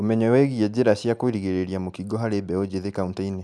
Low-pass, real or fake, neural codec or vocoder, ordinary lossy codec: none; real; none; none